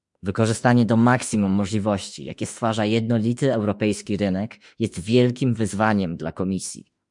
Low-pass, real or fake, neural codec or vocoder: 10.8 kHz; fake; autoencoder, 48 kHz, 32 numbers a frame, DAC-VAE, trained on Japanese speech